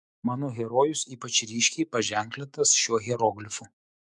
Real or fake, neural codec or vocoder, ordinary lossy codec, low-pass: fake; autoencoder, 48 kHz, 128 numbers a frame, DAC-VAE, trained on Japanese speech; MP3, 96 kbps; 10.8 kHz